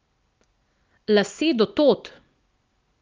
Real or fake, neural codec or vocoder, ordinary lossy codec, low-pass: real; none; Opus, 24 kbps; 7.2 kHz